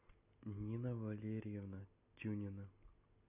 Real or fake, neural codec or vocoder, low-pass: real; none; 3.6 kHz